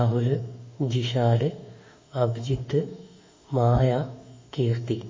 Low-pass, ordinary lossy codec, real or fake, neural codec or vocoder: 7.2 kHz; MP3, 32 kbps; fake; autoencoder, 48 kHz, 32 numbers a frame, DAC-VAE, trained on Japanese speech